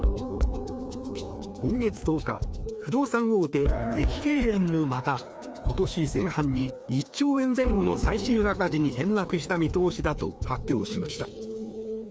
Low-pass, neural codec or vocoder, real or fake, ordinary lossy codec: none; codec, 16 kHz, 2 kbps, FreqCodec, larger model; fake; none